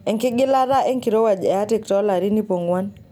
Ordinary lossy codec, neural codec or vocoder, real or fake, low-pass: none; none; real; 19.8 kHz